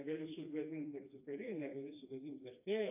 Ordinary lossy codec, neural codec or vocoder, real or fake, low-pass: AAC, 24 kbps; codec, 16 kHz, 2 kbps, FreqCodec, smaller model; fake; 3.6 kHz